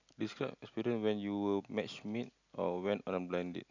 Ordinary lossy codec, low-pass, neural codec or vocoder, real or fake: none; 7.2 kHz; none; real